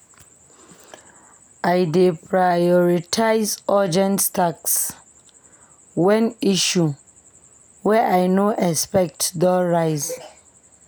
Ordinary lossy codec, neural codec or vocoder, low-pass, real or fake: none; none; none; real